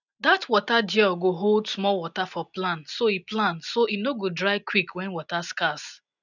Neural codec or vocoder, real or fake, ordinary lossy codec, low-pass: none; real; none; 7.2 kHz